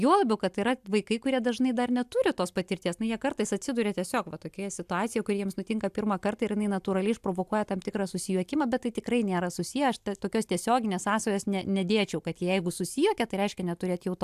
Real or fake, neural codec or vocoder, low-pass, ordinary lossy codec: real; none; 14.4 kHz; AAC, 96 kbps